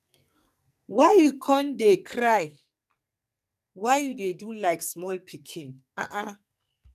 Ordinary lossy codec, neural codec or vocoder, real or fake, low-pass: none; codec, 44.1 kHz, 2.6 kbps, SNAC; fake; 14.4 kHz